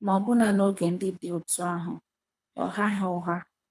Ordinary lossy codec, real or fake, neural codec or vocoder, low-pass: none; fake; codec, 24 kHz, 3 kbps, HILCodec; none